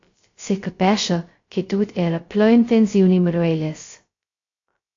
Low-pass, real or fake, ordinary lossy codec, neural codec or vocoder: 7.2 kHz; fake; AAC, 48 kbps; codec, 16 kHz, 0.2 kbps, FocalCodec